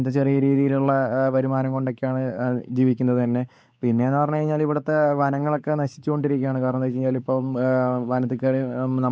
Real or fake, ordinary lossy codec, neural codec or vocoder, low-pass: fake; none; codec, 16 kHz, 4 kbps, X-Codec, WavLM features, trained on Multilingual LibriSpeech; none